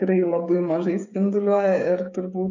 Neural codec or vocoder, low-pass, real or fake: codec, 16 kHz, 8 kbps, FreqCodec, smaller model; 7.2 kHz; fake